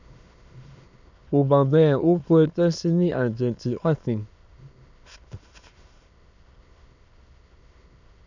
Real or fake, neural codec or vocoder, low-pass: fake; autoencoder, 22.05 kHz, a latent of 192 numbers a frame, VITS, trained on many speakers; 7.2 kHz